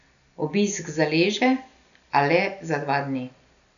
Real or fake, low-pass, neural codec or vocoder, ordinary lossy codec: real; 7.2 kHz; none; none